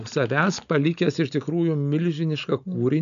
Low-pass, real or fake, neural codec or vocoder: 7.2 kHz; real; none